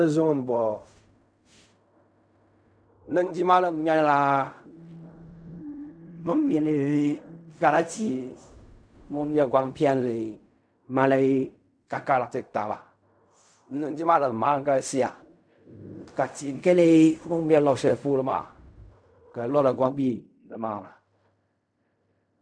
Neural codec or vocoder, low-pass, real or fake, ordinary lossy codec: codec, 16 kHz in and 24 kHz out, 0.4 kbps, LongCat-Audio-Codec, fine tuned four codebook decoder; 9.9 kHz; fake; MP3, 96 kbps